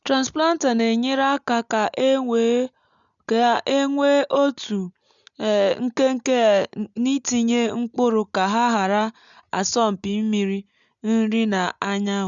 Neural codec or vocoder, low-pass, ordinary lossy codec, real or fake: none; 7.2 kHz; none; real